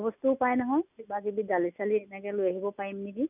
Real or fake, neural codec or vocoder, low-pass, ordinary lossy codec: real; none; 3.6 kHz; none